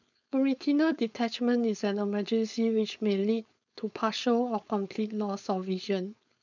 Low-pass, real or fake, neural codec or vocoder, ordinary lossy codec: 7.2 kHz; fake; codec, 16 kHz, 4.8 kbps, FACodec; none